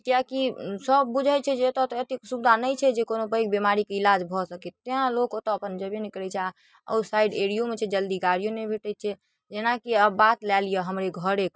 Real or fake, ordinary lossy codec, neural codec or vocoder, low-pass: real; none; none; none